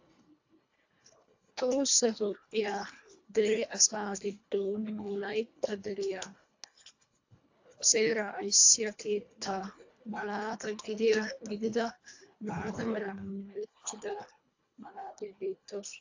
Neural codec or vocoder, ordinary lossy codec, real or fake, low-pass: codec, 24 kHz, 1.5 kbps, HILCodec; AAC, 48 kbps; fake; 7.2 kHz